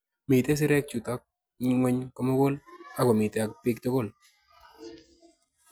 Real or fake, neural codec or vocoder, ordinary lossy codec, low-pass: real; none; none; none